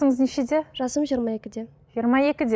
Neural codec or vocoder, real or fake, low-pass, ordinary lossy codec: none; real; none; none